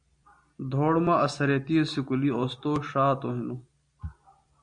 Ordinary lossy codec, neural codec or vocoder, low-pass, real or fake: MP3, 48 kbps; none; 9.9 kHz; real